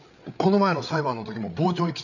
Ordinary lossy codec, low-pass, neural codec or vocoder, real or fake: none; 7.2 kHz; codec, 16 kHz, 8 kbps, FreqCodec, larger model; fake